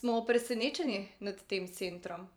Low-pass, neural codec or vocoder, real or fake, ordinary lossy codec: none; vocoder, 44.1 kHz, 128 mel bands every 512 samples, BigVGAN v2; fake; none